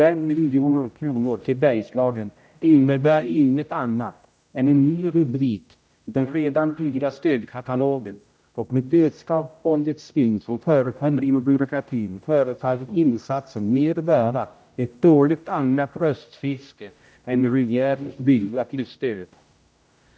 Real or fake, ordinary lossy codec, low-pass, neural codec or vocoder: fake; none; none; codec, 16 kHz, 0.5 kbps, X-Codec, HuBERT features, trained on general audio